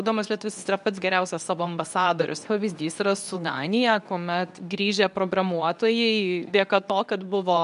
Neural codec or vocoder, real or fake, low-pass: codec, 24 kHz, 0.9 kbps, WavTokenizer, medium speech release version 2; fake; 10.8 kHz